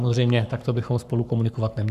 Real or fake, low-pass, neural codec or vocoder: fake; 14.4 kHz; codec, 44.1 kHz, 7.8 kbps, Pupu-Codec